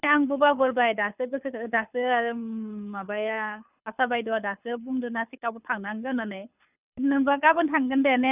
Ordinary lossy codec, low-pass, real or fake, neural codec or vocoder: none; 3.6 kHz; fake; codec, 24 kHz, 6 kbps, HILCodec